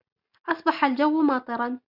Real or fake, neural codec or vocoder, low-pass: real; none; 5.4 kHz